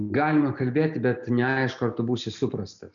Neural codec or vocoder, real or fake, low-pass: none; real; 7.2 kHz